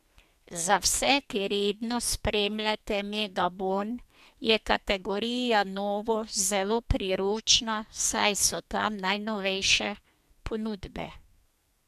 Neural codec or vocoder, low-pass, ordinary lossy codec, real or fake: codec, 44.1 kHz, 2.6 kbps, SNAC; 14.4 kHz; MP3, 96 kbps; fake